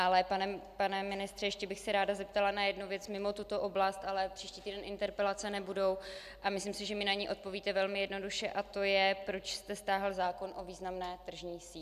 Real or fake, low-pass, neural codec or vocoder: real; 14.4 kHz; none